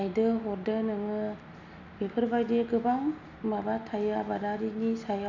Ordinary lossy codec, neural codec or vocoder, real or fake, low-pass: none; none; real; 7.2 kHz